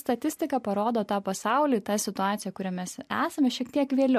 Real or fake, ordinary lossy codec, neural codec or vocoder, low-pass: real; MP3, 64 kbps; none; 14.4 kHz